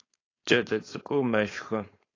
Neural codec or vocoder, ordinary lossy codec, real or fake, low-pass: codec, 16 kHz, 4.8 kbps, FACodec; AAC, 32 kbps; fake; 7.2 kHz